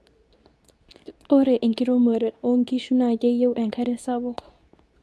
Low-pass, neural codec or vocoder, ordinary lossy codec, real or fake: none; codec, 24 kHz, 0.9 kbps, WavTokenizer, medium speech release version 2; none; fake